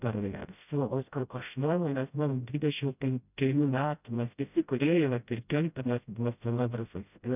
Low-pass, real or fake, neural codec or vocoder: 3.6 kHz; fake; codec, 16 kHz, 0.5 kbps, FreqCodec, smaller model